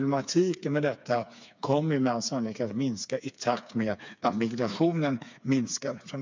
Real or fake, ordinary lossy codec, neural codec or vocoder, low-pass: fake; MP3, 64 kbps; codec, 16 kHz, 4 kbps, FreqCodec, smaller model; 7.2 kHz